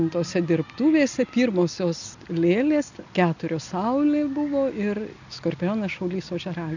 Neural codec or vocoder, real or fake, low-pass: none; real; 7.2 kHz